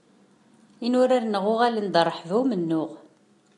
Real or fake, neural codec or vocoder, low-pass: real; none; 10.8 kHz